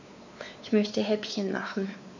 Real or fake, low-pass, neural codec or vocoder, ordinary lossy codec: fake; 7.2 kHz; codec, 16 kHz, 4 kbps, X-Codec, WavLM features, trained on Multilingual LibriSpeech; none